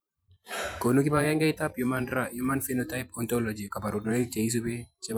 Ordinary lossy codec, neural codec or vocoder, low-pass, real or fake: none; vocoder, 44.1 kHz, 128 mel bands every 512 samples, BigVGAN v2; none; fake